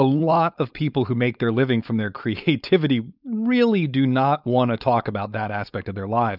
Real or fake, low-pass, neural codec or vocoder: fake; 5.4 kHz; codec, 16 kHz, 4.8 kbps, FACodec